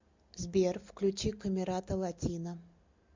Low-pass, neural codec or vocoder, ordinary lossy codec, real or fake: 7.2 kHz; none; MP3, 64 kbps; real